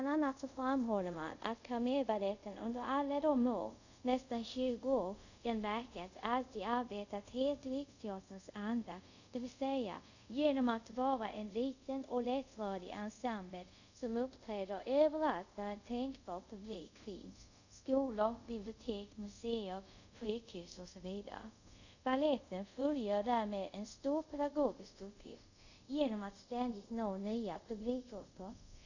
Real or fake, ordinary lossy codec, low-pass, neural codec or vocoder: fake; none; 7.2 kHz; codec, 24 kHz, 0.5 kbps, DualCodec